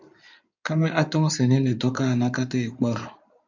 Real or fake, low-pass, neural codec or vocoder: fake; 7.2 kHz; codec, 16 kHz in and 24 kHz out, 2.2 kbps, FireRedTTS-2 codec